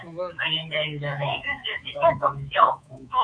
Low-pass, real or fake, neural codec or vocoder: 9.9 kHz; fake; codec, 24 kHz, 3.1 kbps, DualCodec